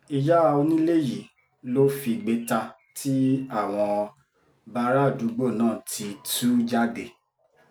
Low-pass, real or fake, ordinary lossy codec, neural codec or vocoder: none; real; none; none